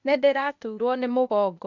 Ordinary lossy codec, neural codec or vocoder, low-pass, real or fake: none; codec, 16 kHz, 0.8 kbps, ZipCodec; 7.2 kHz; fake